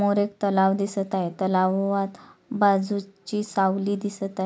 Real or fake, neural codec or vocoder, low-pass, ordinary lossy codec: real; none; none; none